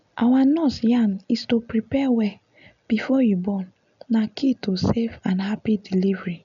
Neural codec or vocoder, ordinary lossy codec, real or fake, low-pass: none; none; real; 7.2 kHz